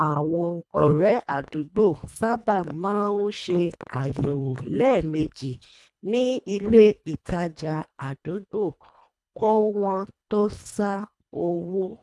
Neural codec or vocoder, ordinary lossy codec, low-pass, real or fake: codec, 24 kHz, 1.5 kbps, HILCodec; none; none; fake